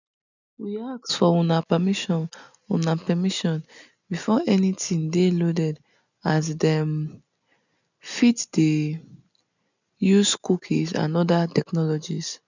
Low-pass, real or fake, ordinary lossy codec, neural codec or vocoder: 7.2 kHz; real; none; none